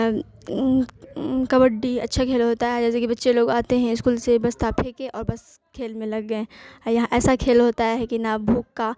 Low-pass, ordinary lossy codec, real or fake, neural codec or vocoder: none; none; real; none